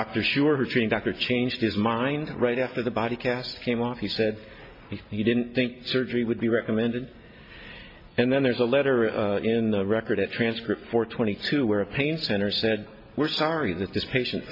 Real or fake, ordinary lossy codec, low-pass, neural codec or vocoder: real; MP3, 32 kbps; 5.4 kHz; none